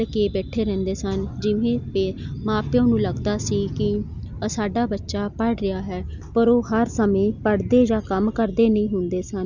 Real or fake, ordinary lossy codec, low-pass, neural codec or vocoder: real; none; 7.2 kHz; none